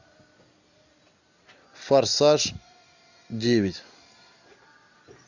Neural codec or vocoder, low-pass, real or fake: none; 7.2 kHz; real